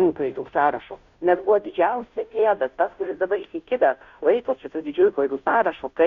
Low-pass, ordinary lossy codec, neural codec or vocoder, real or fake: 7.2 kHz; Opus, 64 kbps; codec, 16 kHz, 0.5 kbps, FunCodec, trained on Chinese and English, 25 frames a second; fake